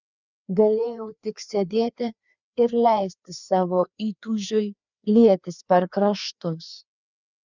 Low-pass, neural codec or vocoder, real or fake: 7.2 kHz; codec, 16 kHz, 2 kbps, FreqCodec, larger model; fake